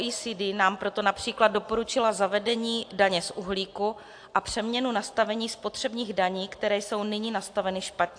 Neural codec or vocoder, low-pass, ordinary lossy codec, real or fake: none; 9.9 kHz; AAC, 64 kbps; real